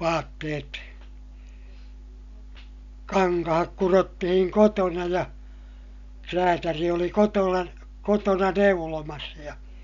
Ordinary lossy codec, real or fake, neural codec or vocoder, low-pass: none; real; none; 7.2 kHz